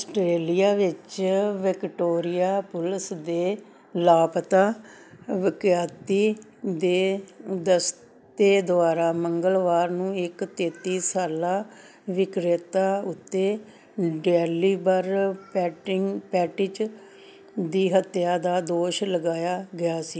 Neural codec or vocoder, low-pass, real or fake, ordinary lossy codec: none; none; real; none